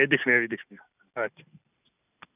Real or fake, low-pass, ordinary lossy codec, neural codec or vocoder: real; 3.6 kHz; none; none